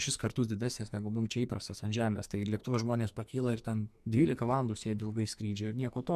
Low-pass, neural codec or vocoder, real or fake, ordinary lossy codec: 14.4 kHz; codec, 44.1 kHz, 2.6 kbps, SNAC; fake; MP3, 96 kbps